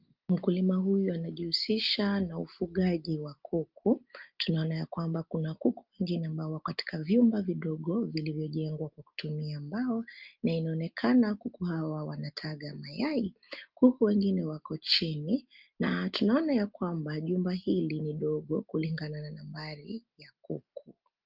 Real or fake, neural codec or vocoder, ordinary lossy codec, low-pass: real; none; Opus, 32 kbps; 5.4 kHz